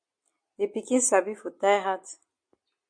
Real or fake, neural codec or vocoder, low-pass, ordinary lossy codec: real; none; 9.9 kHz; MP3, 48 kbps